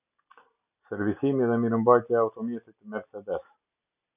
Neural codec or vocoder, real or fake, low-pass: none; real; 3.6 kHz